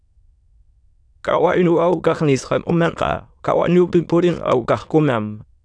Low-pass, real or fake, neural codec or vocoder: 9.9 kHz; fake; autoencoder, 22.05 kHz, a latent of 192 numbers a frame, VITS, trained on many speakers